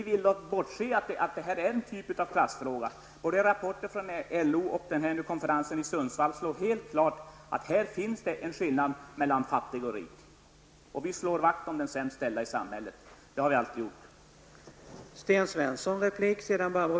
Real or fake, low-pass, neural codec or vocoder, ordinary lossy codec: real; none; none; none